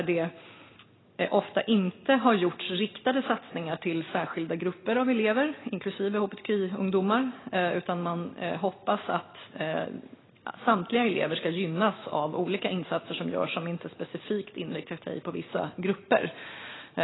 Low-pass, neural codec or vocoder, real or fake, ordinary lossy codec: 7.2 kHz; none; real; AAC, 16 kbps